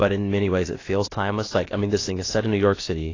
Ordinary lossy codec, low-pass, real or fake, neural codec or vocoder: AAC, 32 kbps; 7.2 kHz; fake; codec, 16 kHz, about 1 kbps, DyCAST, with the encoder's durations